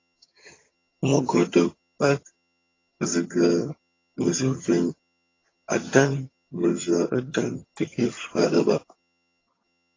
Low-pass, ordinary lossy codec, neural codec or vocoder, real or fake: 7.2 kHz; AAC, 32 kbps; vocoder, 22.05 kHz, 80 mel bands, HiFi-GAN; fake